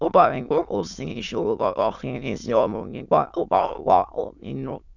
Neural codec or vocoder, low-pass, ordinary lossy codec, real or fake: autoencoder, 22.05 kHz, a latent of 192 numbers a frame, VITS, trained on many speakers; 7.2 kHz; none; fake